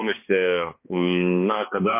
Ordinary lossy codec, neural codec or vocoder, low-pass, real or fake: MP3, 24 kbps; codec, 16 kHz, 2 kbps, X-Codec, HuBERT features, trained on balanced general audio; 3.6 kHz; fake